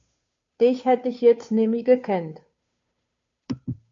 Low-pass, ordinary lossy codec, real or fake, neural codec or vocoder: 7.2 kHz; AAC, 64 kbps; fake; codec, 16 kHz, 2 kbps, FunCodec, trained on Chinese and English, 25 frames a second